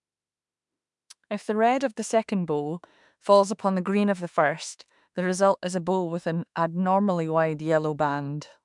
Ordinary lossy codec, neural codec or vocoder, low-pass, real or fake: MP3, 96 kbps; autoencoder, 48 kHz, 32 numbers a frame, DAC-VAE, trained on Japanese speech; 10.8 kHz; fake